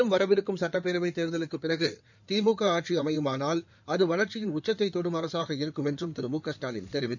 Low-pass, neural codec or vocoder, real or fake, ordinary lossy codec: 7.2 kHz; codec, 16 kHz in and 24 kHz out, 2.2 kbps, FireRedTTS-2 codec; fake; none